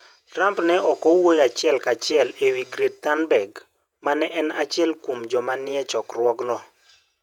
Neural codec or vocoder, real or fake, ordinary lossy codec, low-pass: vocoder, 48 kHz, 128 mel bands, Vocos; fake; none; 19.8 kHz